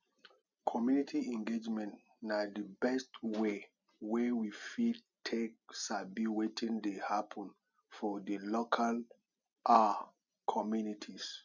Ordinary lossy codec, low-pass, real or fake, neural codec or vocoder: none; 7.2 kHz; real; none